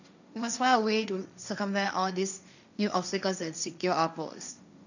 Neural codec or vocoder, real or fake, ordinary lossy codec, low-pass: codec, 16 kHz, 1.1 kbps, Voila-Tokenizer; fake; none; 7.2 kHz